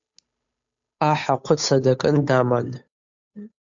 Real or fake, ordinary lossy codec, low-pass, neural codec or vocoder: fake; AAC, 64 kbps; 7.2 kHz; codec, 16 kHz, 8 kbps, FunCodec, trained on Chinese and English, 25 frames a second